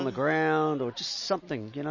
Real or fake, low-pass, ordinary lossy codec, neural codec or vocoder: real; 7.2 kHz; MP3, 32 kbps; none